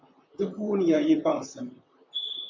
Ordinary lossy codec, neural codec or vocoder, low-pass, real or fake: MP3, 64 kbps; vocoder, 44.1 kHz, 128 mel bands, Pupu-Vocoder; 7.2 kHz; fake